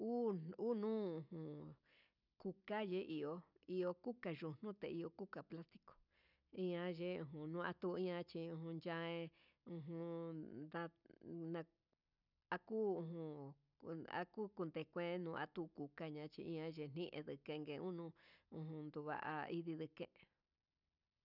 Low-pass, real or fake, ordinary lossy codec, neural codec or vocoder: 5.4 kHz; real; none; none